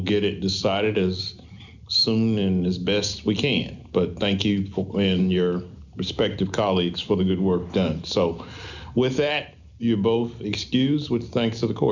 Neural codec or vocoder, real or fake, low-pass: none; real; 7.2 kHz